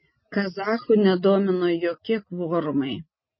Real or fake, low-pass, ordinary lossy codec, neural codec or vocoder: real; 7.2 kHz; MP3, 24 kbps; none